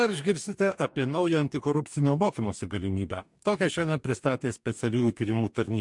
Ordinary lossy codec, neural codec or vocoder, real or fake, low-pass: MP3, 64 kbps; codec, 44.1 kHz, 2.6 kbps, DAC; fake; 10.8 kHz